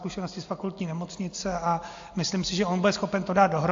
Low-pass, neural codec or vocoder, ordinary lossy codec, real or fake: 7.2 kHz; none; AAC, 48 kbps; real